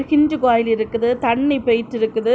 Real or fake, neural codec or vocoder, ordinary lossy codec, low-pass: real; none; none; none